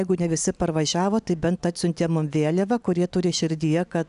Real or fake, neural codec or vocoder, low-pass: real; none; 10.8 kHz